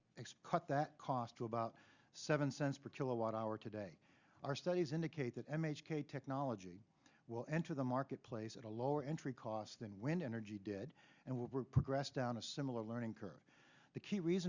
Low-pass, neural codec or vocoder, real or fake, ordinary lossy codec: 7.2 kHz; none; real; Opus, 64 kbps